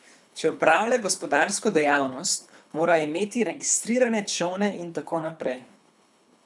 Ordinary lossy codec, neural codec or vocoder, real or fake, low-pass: none; codec, 24 kHz, 3 kbps, HILCodec; fake; none